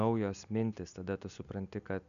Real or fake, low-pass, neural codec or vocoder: real; 7.2 kHz; none